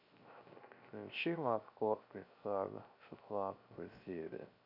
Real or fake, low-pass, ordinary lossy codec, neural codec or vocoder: fake; 5.4 kHz; none; codec, 16 kHz, 0.3 kbps, FocalCodec